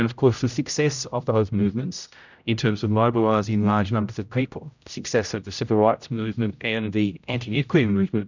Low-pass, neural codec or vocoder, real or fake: 7.2 kHz; codec, 16 kHz, 0.5 kbps, X-Codec, HuBERT features, trained on general audio; fake